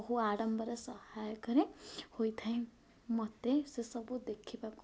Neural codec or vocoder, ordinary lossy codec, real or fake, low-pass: none; none; real; none